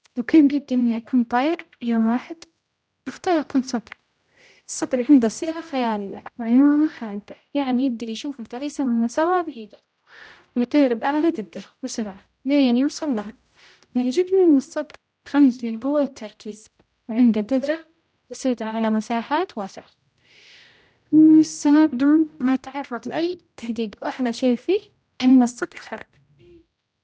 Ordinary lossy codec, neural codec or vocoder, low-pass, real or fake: none; codec, 16 kHz, 0.5 kbps, X-Codec, HuBERT features, trained on general audio; none; fake